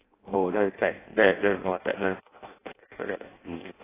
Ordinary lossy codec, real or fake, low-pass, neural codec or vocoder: AAC, 16 kbps; fake; 3.6 kHz; codec, 16 kHz in and 24 kHz out, 0.6 kbps, FireRedTTS-2 codec